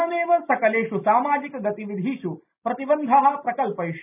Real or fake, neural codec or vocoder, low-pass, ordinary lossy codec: real; none; 3.6 kHz; none